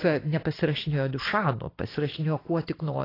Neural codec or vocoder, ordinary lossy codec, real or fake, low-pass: none; AAC, 24 kbps; real; 5.4 kHz